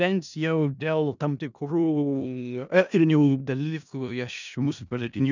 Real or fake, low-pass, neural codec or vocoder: fake; 7.2 kHz; codec, 16 kHz in and 24 kHz out, 0.4 kbps, LongCat-Audio-Codec, four codebook decoder